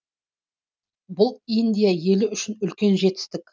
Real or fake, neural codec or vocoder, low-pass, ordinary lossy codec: real; none; none; none